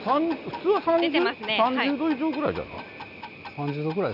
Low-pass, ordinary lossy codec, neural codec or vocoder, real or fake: 5.4 kHz; none; none; real